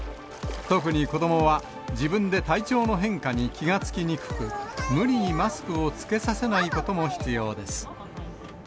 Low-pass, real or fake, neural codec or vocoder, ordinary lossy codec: none; real; none; none